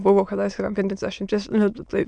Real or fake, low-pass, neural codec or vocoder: fake; 9.9 kHz; autoencoder, 22.05 kHz, a latent of 192 numbers a frame, VITS, trained on many speakers